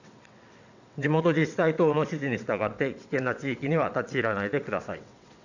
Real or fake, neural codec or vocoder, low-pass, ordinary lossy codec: fake; vocoder, 22.05 kHz, 80 mel bands, WaveNeXt; 7.2 kHz; none